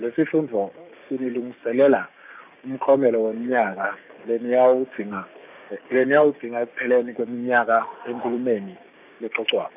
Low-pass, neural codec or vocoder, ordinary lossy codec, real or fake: 3.6 kHz; codec, 16 kHz, 6 kbps, DAC; none; fake